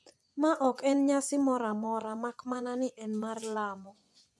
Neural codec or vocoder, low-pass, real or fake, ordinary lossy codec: vocoder, 24 kHz, 100 mel bands, Vocos; none; fake; none